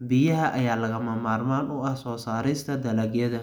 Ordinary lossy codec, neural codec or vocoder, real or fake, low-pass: none; none; real; none